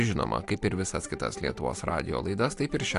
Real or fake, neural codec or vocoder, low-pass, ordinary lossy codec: real; none; 10.8 kHz; AAC, 64 kbps